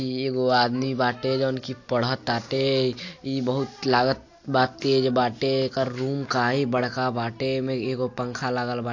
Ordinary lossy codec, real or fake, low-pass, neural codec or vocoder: AAC, 48 kbps; real; 7.2 kHz; none